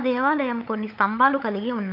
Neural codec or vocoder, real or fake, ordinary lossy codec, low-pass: codec, 16 kHz, 16 kbps, FunCodec, trained on LibriTTS, 50 frames a second; fake; none; 5.4 kHz